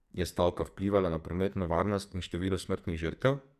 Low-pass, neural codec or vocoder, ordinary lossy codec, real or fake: 14.4 kHz; codec, 44.1 kHz, 2.6 kbps, SNAC; none; fake